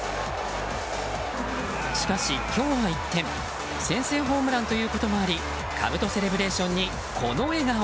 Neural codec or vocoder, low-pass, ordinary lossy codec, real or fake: none; none; none; real